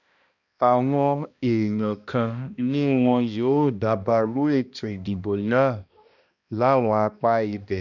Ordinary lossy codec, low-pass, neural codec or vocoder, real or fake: none; 7.2 kHz; codec, 16 kHz, 1 kbps, X-Codec, HuBERT features, trained on balanced general audio; fake